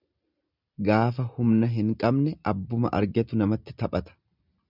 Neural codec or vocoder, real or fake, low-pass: none; real; 5.4 kHz